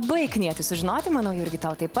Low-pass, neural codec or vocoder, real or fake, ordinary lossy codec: 19.8 kHz; none; real; Opus, 24 kbps